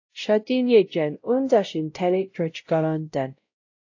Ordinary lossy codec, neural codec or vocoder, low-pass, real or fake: AAC, 48 kbps; codec, 16 kHz, 0.5 kbps, X-Codec, WavLM features, trained on Multilingual LibriSpeech; 7.2 kHz; fake